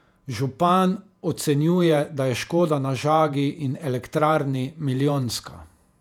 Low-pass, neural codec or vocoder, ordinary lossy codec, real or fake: 19.8 kHz; vocoder, 48 kHz, 128 mel bands, Vocos; none; fake